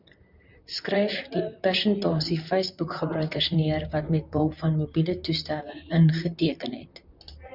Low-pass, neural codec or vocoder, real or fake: 5.4 kHz; vocoder, 44.1 kHz, 128 mel bands, Pupu-Vocoder; fake